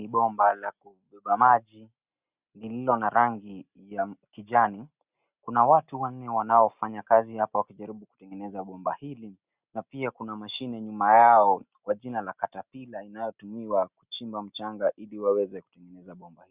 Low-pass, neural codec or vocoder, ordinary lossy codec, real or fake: 3.6 kHz; none; Opus, 64 kbps; real